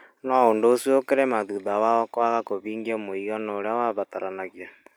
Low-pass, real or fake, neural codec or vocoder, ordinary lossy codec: none; real; none; none